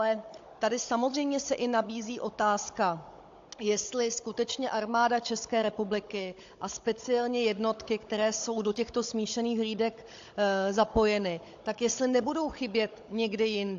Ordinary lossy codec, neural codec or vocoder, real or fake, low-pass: AAC, 48 kbps; codec, 16 kHz, 16 kbps, FunCodec, trained on Chinese and English, 50 frames a second; fake; 7.2 kHz